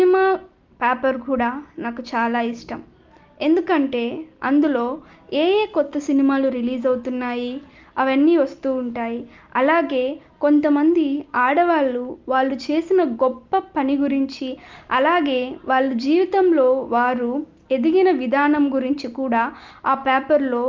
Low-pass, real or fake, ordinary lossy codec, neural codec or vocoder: 7.2 kHz; real; Opus, 32 kbps; none